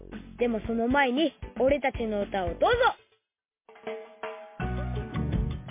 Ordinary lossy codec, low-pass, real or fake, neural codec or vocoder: MP3, 24 kbps; 3.6 kHz; real; none